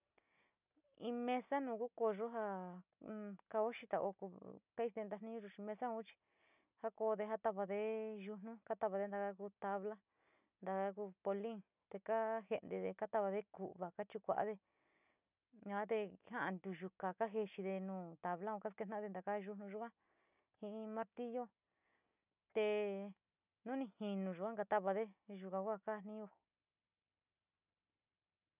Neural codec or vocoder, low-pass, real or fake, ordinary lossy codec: none; 3.6 kHz; real; none